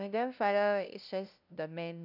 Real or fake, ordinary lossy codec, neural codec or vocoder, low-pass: fake; none; codec, 16 kHz, 0.5 kbps, FunCodec, trained on LibriTTS, 25 frames a second; 5.4 kHz